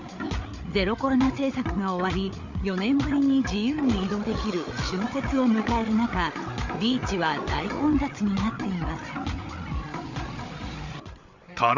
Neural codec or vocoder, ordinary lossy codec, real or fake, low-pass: codec, 16 kHz, 8 kbps, FreqCodec, larger model; none; fake; 7.2 kHz